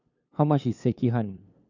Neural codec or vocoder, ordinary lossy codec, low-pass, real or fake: codec, 16 kHz, 2 kbps, FunCodec, trained on LibriTTS, 25 frames a second; none; 7.2 kHz; fake